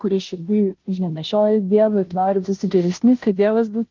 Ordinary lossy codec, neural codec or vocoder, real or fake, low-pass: Opus, 32 kbps; codec, 16 kHz, 0.5 kbps, FunCodec, trained on Chinese and English, 25 frames a second; fake; 7.2 kHz